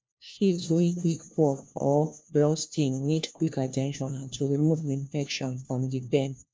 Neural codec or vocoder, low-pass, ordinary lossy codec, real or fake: codec, 16 kHz, 1 kbps, FunCodec, trained on LibriTTS, 50 frames a second; none; none; fake